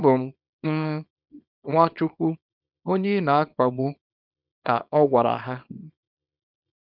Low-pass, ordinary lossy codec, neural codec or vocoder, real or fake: 5.4 kHz; none; codec, 24 kHz, 0.9 kbps, WavTokenizer, small release; fake